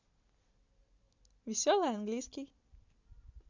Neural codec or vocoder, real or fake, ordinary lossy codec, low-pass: none; real; none; 7.2 kHz